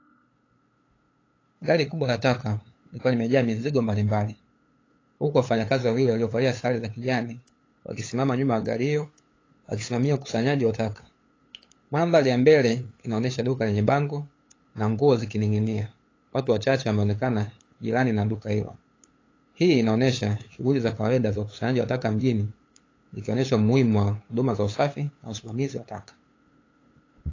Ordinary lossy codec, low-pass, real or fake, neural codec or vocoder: AAC, 32 kbps; 7.2 kHz; fake; codec, 16 kHz, 8 kbps, FunCodec, trained on LibriTTS, 25 frames a second